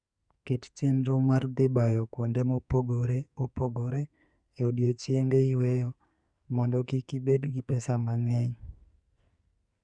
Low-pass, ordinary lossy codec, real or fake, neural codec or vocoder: 9.9 kHz; Opus, 64 kbps; fake; codec, 44.1 kHz, 2.6 kbps, SNAC